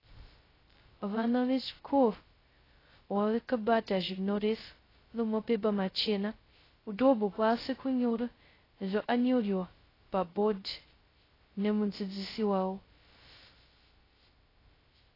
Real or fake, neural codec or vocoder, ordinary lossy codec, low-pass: fake; codec, 16 kHz, 0.2 kbps, FocalCodec; AAC, 24 kbps; 5.4 kHz